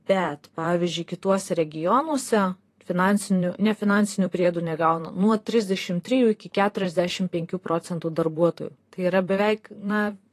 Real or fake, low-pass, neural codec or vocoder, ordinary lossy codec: fake; 14.4 kHz; vocoder, 44.1 kHz, 128 mel bands, Pupu-Vocoder; AAC, 48 kbps